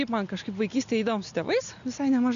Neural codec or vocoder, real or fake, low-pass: none; real; 7.2 kHz